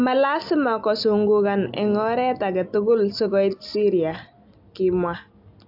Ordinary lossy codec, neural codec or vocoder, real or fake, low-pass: AAC, 48 kbps; none; real; 5.4 kHz